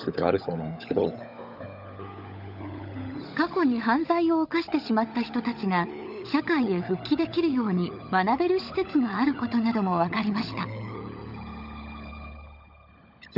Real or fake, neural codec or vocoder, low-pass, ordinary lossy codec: fake; codec, 16 kHz, 16 kbps, FunCodec, trained on LibriTTS, 50 frames a second; 5.4 kHz; none